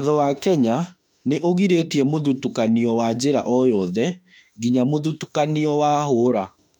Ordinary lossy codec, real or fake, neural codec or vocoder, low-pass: none; fake; autoencoder, 48 kHz, 32 numbers a frame, DAC-VAE, trained on Japanese speech; 19.8 kHz